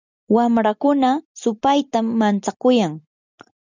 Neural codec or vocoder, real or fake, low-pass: none; real; 7.2 kHz